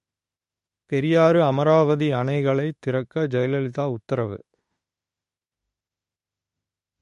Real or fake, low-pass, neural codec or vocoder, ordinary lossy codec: fake; 14.4 kHz; autoencoder, 48 kHz, 32 numbers a frame, DAC-VAE, trained on Japanese speech; MP3, 48 kbps